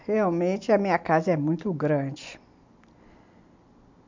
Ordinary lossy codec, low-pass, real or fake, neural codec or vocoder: MP3, 64 kbps; 7.2 kHz; real; none